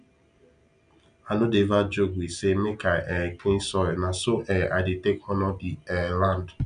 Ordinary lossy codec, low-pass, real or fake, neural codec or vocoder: none; 9.9 kHz; real; none